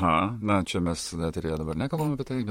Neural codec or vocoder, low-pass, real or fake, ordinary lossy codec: vocoder, 44.1 kHz, 128 mel bands, Pupu-Vocoder; 19.8 kHz; fake; MP3, 64 kbps